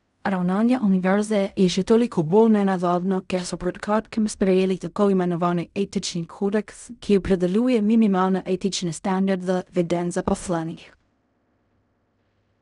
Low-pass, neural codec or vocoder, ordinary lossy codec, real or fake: 10.8 kHz; codec, 16 kHz in and 24 kHz out, 0.4 kbps, LongCat-Audio-Codec, fine tuned four codebook decoder; none; fake